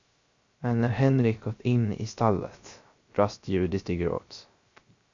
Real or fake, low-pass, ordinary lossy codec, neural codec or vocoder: fake; 7.2 kHz; Opus, 64 kbps; codec, 16 kHz, 0.3 kbps, FocalCodec